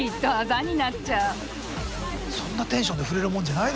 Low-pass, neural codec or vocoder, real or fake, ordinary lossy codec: none; none; real; none